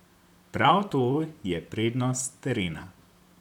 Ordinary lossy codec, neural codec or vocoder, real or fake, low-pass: none; vocoder, 44.1 kHz, 128 mel bands, Pupu-Vocoder; fake; 19.8 kHz